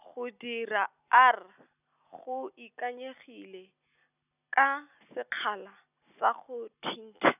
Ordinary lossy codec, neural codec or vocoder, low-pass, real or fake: none; none; 3.6 kHz; real